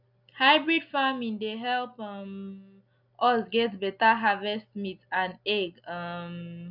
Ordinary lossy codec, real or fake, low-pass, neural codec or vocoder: none; real; 5.4 kHz; none